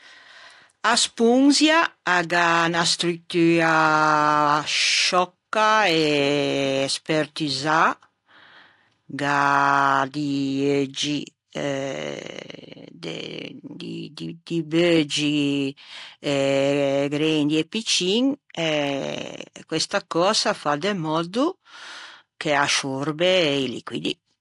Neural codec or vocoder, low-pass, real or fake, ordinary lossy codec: none; 10.8 kHz; real; AAC, 48 kbps